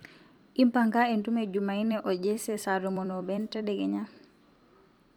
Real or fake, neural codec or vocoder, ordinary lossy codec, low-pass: fake; vocoder, 44.1 kHz, 128 mel bands every 256 samples, BigVGAN v2; MP3, 96 kbps; 19.8 kHz